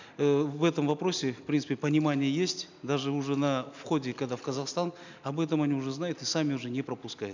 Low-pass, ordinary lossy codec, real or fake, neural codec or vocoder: 7.2 kHz; none; real; none